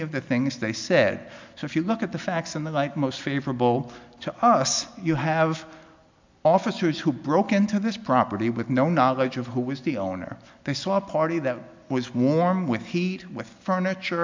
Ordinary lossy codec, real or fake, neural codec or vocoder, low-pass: MP3, 64 kbps; real; none; 7.2 kHz